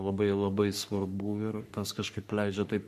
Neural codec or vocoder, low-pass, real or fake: codec, 44.1 kHz, 3.4 kbps, Pupu-Codec; 14.4 kHz; fake